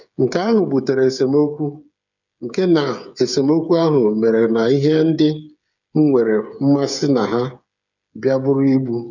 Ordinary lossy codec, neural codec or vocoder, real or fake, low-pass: none; codec, 16 kHz, 8 kbps, FreqCodec, smaller model; fake; 7.2 kHz